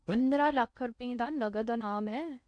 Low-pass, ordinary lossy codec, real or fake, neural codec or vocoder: 9.9 kHz; none; fake; codec, 16 kHz in and 24 kHz out, 0.6 kbps, FocalCodec, streaming, 2048 codes